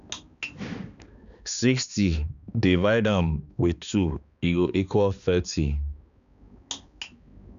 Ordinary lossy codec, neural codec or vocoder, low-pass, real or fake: none; codec, 16 kHz, 2 kbps, X-Codec, HuBERT features, trained on balanced general audio; 7.2 kHz; fake